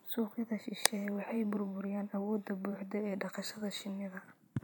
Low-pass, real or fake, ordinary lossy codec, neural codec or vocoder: none; fake; none; vocoder, 44.1 kHz, 128 mel bands every 512 samples, BigVGAN v2